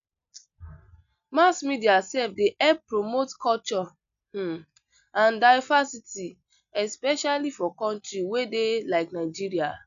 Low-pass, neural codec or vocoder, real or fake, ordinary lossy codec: 7.2 kHz; none; real; AAC, 96 kbps